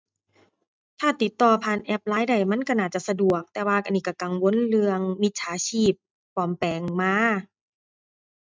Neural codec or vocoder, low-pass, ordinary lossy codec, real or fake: none; none; none; real